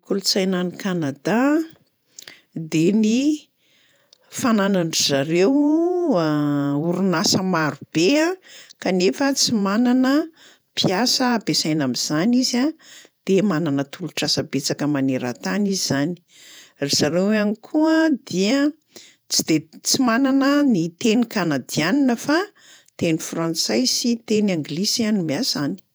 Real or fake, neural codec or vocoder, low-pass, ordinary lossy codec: fake; vocoder, 48 kHz, 128 mel bands, Vocos; none; none